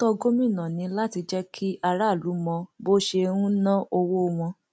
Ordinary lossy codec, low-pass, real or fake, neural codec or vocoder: none; none; real; none